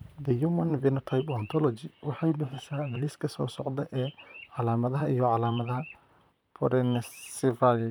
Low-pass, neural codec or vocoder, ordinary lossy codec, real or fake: none; vocoder, 44.1 kHz, 128 mel bands, Pupu-Vocoder; none; fake